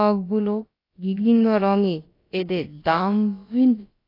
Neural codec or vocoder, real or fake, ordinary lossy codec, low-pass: codec, 16 kHz, about 1 kbps, DyCAST, with the encoder's durations; fake; AAC, 32 kbps; 5.4 kHz